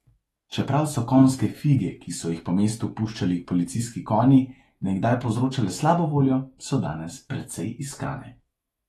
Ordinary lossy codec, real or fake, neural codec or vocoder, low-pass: AAC, 32 kbps; fake; autoencoder, 48 kHz, 128 numbers a frame, DAC-VAE, trained on Japanese speech; 19.8 kHz